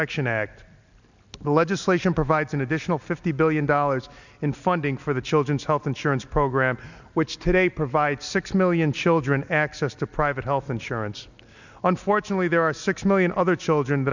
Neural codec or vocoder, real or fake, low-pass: none; real; 7.2 kHz